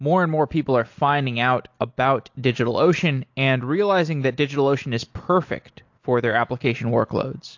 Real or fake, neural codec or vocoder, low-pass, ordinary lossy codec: real; none; 7.2 kHz; AAC, 48 kbps